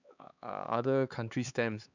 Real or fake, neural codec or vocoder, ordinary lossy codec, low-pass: fake; codec, 16 kHz, 4 kbps, X-Codec, HuBERT features, trained on LibriSpeech; none; 7.2 kHz